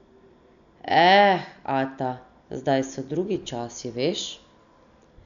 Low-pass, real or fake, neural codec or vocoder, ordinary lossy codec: 7.2 kHz; real; none; none